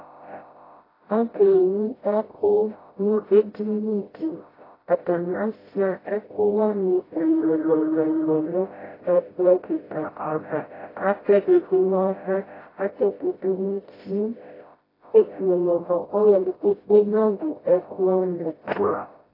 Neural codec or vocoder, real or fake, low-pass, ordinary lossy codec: codec, 16 kHz, 0.5 kbps, FreqCodec, smaller model; fake; 5.4 kHz; AAC, 24 kbps